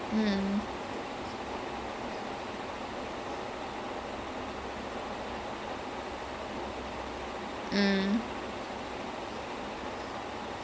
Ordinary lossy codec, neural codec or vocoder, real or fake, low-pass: none; none; real; none